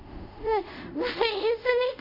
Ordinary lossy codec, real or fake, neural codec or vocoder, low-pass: none; fake; codec, 24 kHz, 0.5 kbps, DualCodec; 5.4 kHz